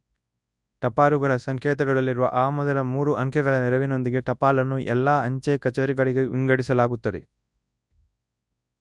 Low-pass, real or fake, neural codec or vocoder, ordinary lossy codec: 10.8 kHz; fake; codec, 24 kHz, 0.9 kbps, WavTokenizer, large speech release; none